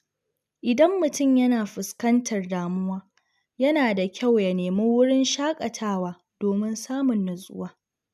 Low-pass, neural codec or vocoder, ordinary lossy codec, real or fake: 14.4 kHz; none; none; real